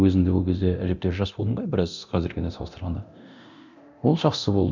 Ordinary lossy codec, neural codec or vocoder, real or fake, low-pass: none; codec, 24 kHz, 0.9 kbps, DualCodec; fake; 7.2 kHz